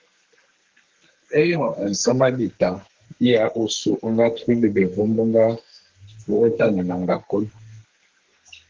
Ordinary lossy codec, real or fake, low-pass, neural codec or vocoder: Opus, 16 kbps; fake; 7.2 kHz; codec, 32 kHz, 1.9 kbps, SNAC